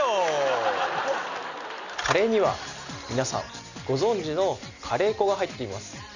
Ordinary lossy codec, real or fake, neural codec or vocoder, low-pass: none; real; none; 7.2 kHz